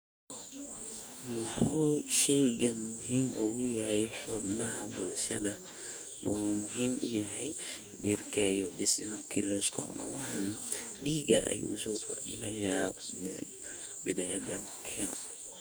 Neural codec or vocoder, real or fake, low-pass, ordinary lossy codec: codec, 44.1 kHz, 2.6 kbps, DAC; fake; none; none